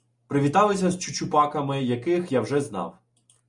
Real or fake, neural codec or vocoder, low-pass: real; none; 10.8 kHz